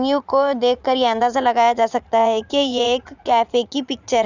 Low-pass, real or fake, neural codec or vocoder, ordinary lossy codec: 7.2 kHz; fake; vocoder, 44.1 kHz, 80 mel bands, Vocos; none